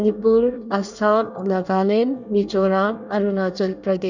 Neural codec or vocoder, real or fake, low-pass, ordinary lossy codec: codec, 24 kHz, 1 kbps, SNAC; fake; 7.2 kHz; none